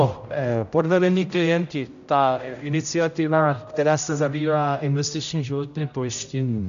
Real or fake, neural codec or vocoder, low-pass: fake; codec, 16 kHz, 0.5 kbps, X-Codec, HuBERT features, trained on general audio; 7.2 kHz